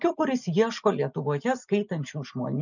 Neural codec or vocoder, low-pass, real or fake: none; 7.2 kHz; real